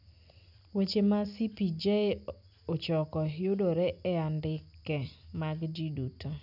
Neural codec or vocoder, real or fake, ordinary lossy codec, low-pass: none; real; none; 5.4 kHz